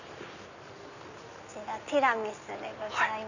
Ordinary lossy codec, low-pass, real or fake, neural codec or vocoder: none; 7.2 kHz; real; none